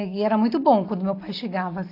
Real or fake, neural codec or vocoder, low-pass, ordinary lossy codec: real; none; 5.4 kHz; AAC, 32 kbps